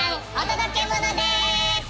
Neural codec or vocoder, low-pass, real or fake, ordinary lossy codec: none; none; real; none